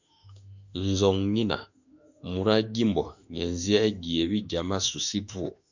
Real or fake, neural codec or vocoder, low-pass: fake; autoencoder, 48 kHz, 32 numbers a frame, DAC-VAE, trained on Japanese speech; 7.2 kHz